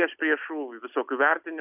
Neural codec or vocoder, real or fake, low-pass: none; real; 3.6 kHz